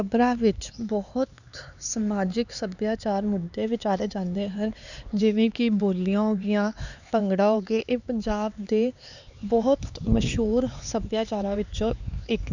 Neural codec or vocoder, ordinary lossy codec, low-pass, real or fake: codec, 16 kHz, 4 kbps, X-Codec, HuBERT features, trained on LibriSpeech; none; 7.2 kHz; fake